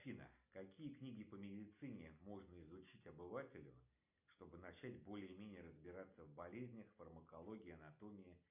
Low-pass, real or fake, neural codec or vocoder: 3.6 kHz; real; none